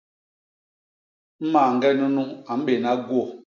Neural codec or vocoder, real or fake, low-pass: none; real; 7.2 kHz